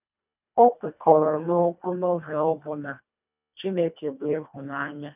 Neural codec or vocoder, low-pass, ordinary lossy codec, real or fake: codec, 24 kHz, 1.5 kbps, HILCodec; 3.6 kHz; none; fake